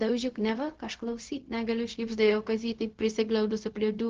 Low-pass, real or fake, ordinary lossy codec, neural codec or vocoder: 7.2 kHz; fake; Opus, 16 kbps; codec, 16 kHz, 0.4 kbps, LongCat-Audio-Codec